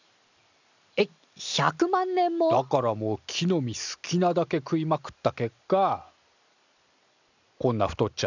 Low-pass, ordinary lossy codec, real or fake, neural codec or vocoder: 7.2 kHz; none; real; none